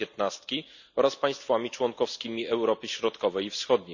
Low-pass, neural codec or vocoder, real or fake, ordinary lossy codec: 7.2 kHz; none; real; none